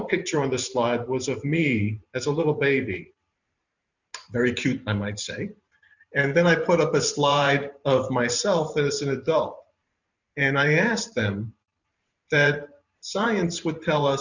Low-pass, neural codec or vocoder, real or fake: 7.2 kHz; vocoder, 44.1 kHz, 128 mel bands every 512 samples, BigVGAN v2; fake